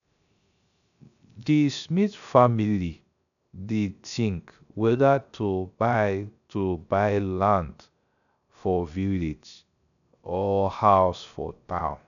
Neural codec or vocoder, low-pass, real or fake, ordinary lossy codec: codec, 16 kHz, 0.3 kbps, FocalCodec; 7.2 kHz; fake; none